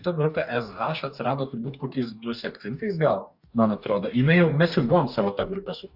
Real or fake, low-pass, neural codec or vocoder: fake; 5.4 kHz; codec, 44.1 kHz, 2.6 kbps, DAC